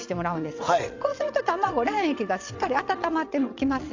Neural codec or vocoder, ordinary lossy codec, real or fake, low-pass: vocoder, 22.05 kHz, 80 mel bands, WaveNeXt; AAC, 48 kbps; fake; 7.2 kHz